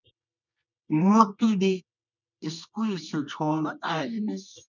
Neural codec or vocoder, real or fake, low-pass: codec, 24 kHz, 0.9 kbps, WavTokenizer, medium music audio release; fake; 7.2 kHz